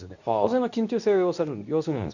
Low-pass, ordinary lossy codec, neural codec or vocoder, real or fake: 7.2 kHz; none; codec, 24 kHz, 0.9 kbps, WavTokenizer, medium speech release version 2; fake